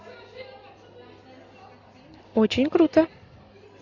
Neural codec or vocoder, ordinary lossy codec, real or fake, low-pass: none; AAC, 48 kbps; real; 7.2 kHz